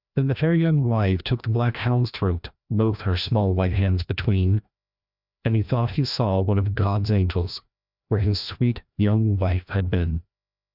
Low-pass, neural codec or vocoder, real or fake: 5.4 kHz; codec, 16 kHz, 1 kbps, FreqCodec, larger model; fake